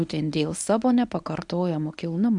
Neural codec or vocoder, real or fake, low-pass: codec, 24 kHz, 0.9 kbps, WavTokenizer, medium speech release version 1; fake; 10.8 kHz